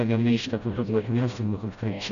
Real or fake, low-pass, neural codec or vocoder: fake; 7.2 kHz; codec, 16 kHz, 0.5 kbps, FreqCodec, smaller model